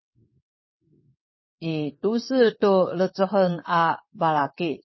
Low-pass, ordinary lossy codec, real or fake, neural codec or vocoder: 7.2 kHz; MP3, 24 kbps; fake; vocoder, 22.05 kHz, 80 mel bands, WaveNeXt